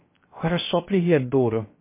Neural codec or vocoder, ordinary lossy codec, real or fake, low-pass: codec, 16 kHz, 0.3 kbps, FocalCodec; MP3, 24 kbps; fake; 3.6 kHz